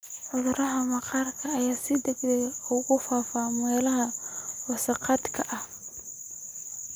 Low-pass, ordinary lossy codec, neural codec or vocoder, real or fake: none; none; none; real